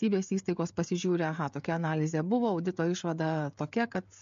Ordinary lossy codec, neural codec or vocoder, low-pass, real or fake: MP3, 48 kbps; codec, 16 kHz, 16 kbps, FreqCodec, smaller model; 7.2 kHz; fake